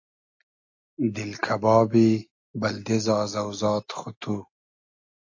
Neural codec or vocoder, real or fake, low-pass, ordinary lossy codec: none; real; 7.2 kHz; AAC, 48 kbps